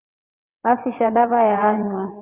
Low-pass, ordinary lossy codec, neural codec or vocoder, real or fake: 3.6 kHz; Opus, 64 kbps; vocoder, 22.05 kHz, 80 mel bands, WaveNeXt; fake